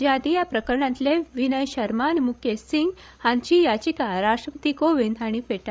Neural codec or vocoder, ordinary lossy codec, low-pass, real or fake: codec, 16 kHz, 16 kbps, FreqCodec, larger model; none; none; fake